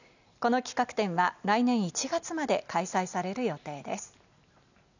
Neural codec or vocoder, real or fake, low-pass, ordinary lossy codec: none; real; 7.2 kHz; none